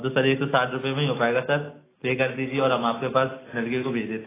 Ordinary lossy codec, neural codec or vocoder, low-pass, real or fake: AAC, 16 kbps; none; 3.6 kHz; real